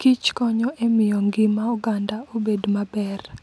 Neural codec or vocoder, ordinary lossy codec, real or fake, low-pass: none; none; real; none